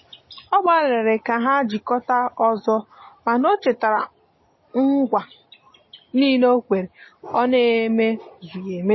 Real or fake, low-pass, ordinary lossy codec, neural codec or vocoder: real; 7.2 kHz; MP3, 24 kbps; none